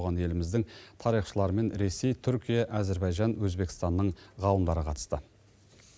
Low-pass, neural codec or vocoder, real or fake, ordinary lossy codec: none; none; real; none